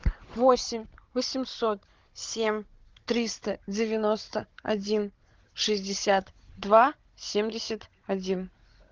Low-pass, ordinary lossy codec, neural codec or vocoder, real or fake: 7.2 kHz; Opus, 16 kbps; codec, 16 kHz, 16 kbps, FunCodec, trained on Chinese and English, 50 frames a second; fake